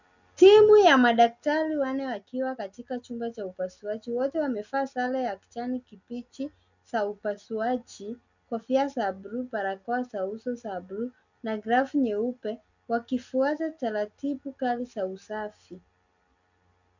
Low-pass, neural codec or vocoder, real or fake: 7.2 kHz; none; real